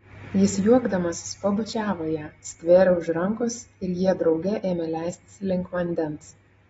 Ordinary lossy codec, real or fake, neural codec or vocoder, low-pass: AAC, 24 kbps; real; none; 10.8 kHz